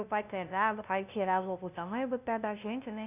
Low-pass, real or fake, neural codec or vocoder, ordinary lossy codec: 3.6 kHz; fake; codec, 16 kHz, 0.5 kbps, FunCodec, trained on LibriTTS, 25 frames a second; none